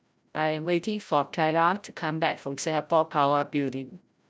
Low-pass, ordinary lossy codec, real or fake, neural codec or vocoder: none; none; fake; codec, 16 kHz, 0.5 kbps, FreqCodec, larger model